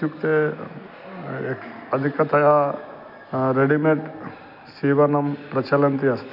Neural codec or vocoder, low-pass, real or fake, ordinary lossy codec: none; 5.4 kHz; real; none